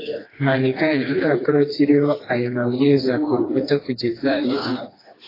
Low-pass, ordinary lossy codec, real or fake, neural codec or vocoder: 5.4 kHz; AAC, 24 kbps; fake; codec, 16 kHz, 2 kbps, FreqCodec, smaller model